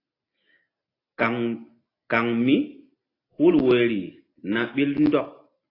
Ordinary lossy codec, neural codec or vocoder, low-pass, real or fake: AAC, 24 kbps; none; 5.4 kHz; real